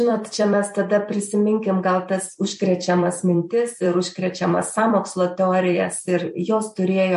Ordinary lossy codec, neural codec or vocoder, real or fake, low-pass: MP3, 48 kbps; vocoder, 44.1 kHz, 128 mel bands every 256 samples, BigVGAN v2; fake; 14.4 kHz